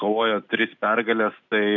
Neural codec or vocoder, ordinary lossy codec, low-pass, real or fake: none; MP3, 64 kbps; 7.2 kHz; real